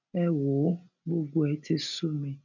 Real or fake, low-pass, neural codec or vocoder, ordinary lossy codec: real; 7.2 kHz; none; none